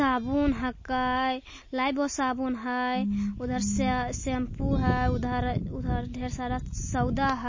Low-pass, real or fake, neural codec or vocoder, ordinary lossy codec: 7.2 kHz; real; none; MP3, 32 kbps